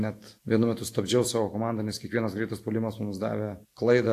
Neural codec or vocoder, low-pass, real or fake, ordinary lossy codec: none; 14.4 kHz; real; AAC, 64 kbps